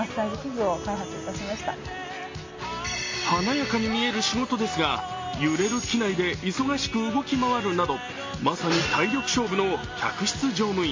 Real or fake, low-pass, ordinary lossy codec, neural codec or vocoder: real; 7.2 kHz; MP3, 32 kbps; none